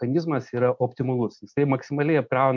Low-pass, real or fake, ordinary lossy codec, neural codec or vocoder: 7.2 kHz; real; MP3, 64 kbps; none